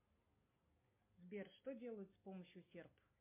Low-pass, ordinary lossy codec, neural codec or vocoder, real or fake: 3.6 kHz; MP3, 24 kbps; none; real